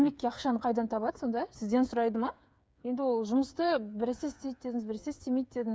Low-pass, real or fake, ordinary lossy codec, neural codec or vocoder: none; fake; none; codec, 16 kHz, 8 kbps, FreqCodec, smaller model